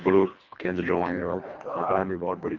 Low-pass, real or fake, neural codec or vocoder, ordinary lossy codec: 7.2 kHz; fake; codec, 24 kHz, 1.5 kbps, HILCodec; Opus, 32 kbps